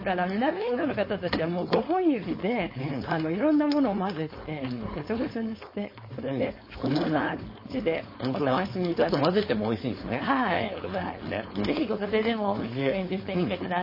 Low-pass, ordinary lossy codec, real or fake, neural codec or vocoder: 5.4 kHz; MP3, 24 kbps; fake; codec, 16 kHz, 4.8 kbps, FACodec